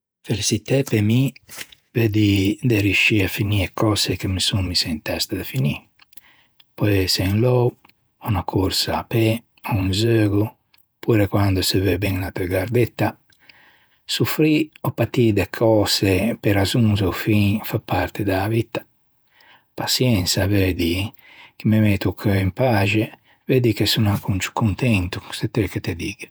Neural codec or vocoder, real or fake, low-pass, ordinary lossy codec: vocoder, 48 kHz, 128 mel bands, Vocos; fake; none; none